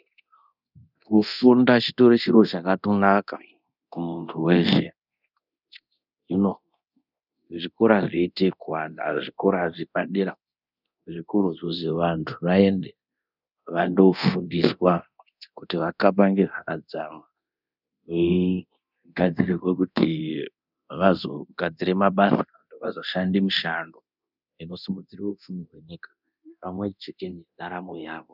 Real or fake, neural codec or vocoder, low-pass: fake; codec, 24 kHz, 0.9 kbps, DualCodec; 5.4 kHz